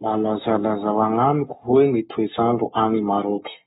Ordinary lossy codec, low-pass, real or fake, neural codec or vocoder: AAC, 16 kbps; 19.8 kHz; fake; codec, 44.1 kHz, 7.8 kbps, Pupu-Codec